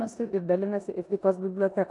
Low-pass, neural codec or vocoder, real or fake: 10.8 kHz; codec, 16 kHz in and 24 kHz out, 0.9 kbps, LongCat-Audio-Codec, four codebook decoder; fake